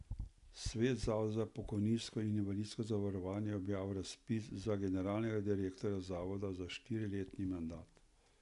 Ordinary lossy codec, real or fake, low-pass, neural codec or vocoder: none; real; 10.8 kHz; none